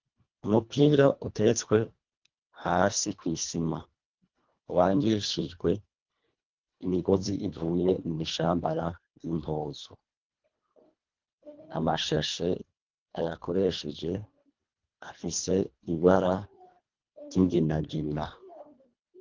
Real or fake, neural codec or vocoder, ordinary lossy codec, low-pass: fake; codec, 24 kHz, 1.5 kbps, HILCodec; Opus, 32 kbps; 7.2 kHz